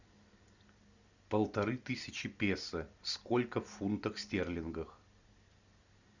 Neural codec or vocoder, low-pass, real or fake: none; 7.2 kHz; real